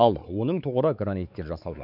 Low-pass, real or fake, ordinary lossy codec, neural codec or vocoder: 5.4 kHz; fake; none; codec, 16 kHz, 4 kbps, X-Codec, WavLM features, trained on Multilingual LibriSpeech